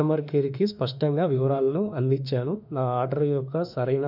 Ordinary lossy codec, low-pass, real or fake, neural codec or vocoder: none; 5.4 kHz; fake; autoencoder, 48 kHz, 32 numbers a frame, DAC-VAE, trained on Japanese speech